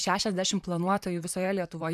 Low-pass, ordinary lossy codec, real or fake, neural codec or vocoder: 14.4 kHz; MP3, 96 kbps; fake; vocoder, 44.1 kHz, 128 mel bands, Pupu-Vocoder